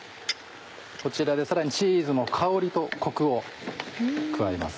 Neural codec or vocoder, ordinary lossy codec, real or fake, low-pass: none; none; real; none